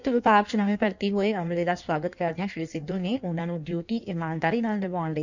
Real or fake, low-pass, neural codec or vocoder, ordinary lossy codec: fake; 7.2 kHz; codec, 16 kHz in and 24 kHz out, 1.1 kbps, FireRedTTS-2 codec; none